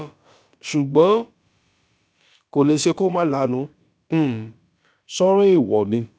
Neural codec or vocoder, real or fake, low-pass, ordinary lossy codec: codec, 16 kHz, about 1 kbps, DyCAST, with the encoder's durations; fake; none; none